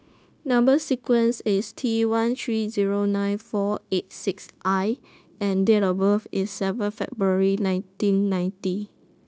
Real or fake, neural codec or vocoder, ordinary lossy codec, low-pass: fake; codec, 16 kHz, 0.9 kbps, LongCat-Audio-Codec; none; none